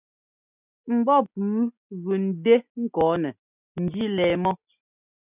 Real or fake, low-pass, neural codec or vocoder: real; 3.6 kHz; none